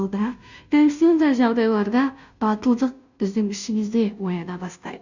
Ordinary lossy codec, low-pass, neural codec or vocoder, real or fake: none; 7.2 kHz; codec, 16 kHz, 0.5 kbps, FunCodec, trained on Chinese and English, 25 frames a second; fake